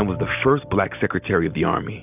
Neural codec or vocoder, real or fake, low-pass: none; real; 3.6 kHz